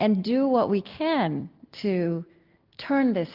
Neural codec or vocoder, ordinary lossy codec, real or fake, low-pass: none; Opus, 32 kbps; real; 5.4 kHz